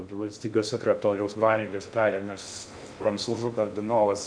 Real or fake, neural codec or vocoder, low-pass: fake; codec, 16 kHz in and 24 kHz out, 0.6 kbps, FocalCodec, streaming, 2048 codes; 9.9 kHz